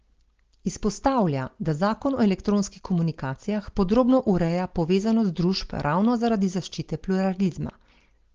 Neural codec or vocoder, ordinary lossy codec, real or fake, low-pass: none; Opus, 16 kbps; real; 7.2 kHz